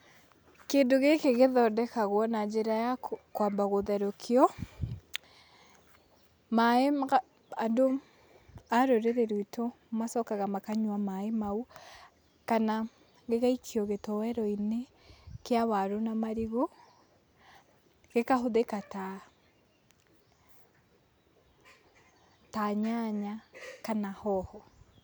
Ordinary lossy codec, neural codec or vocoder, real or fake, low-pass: none; none; real; none